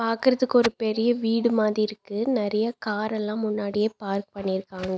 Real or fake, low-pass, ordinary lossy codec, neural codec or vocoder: real; none; none; none